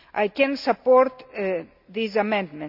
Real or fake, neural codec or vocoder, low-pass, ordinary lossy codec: real; none; 5.4 kHz; none